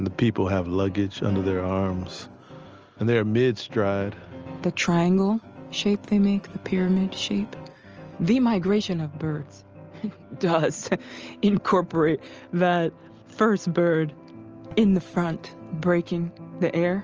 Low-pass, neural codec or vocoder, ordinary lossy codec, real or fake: 7.2 kHz; none; Opus, 24 kbps; real